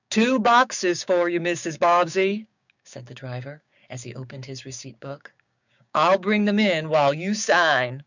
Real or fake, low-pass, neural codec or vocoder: fake; 7.2 kHz; codec, 16 kHz, 6 kbps, DAC